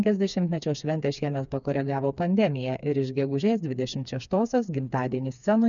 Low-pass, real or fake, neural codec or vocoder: 7.2 kHz; fake; codec, 16 kHz, 4 kbps, FreqCodec, smaller model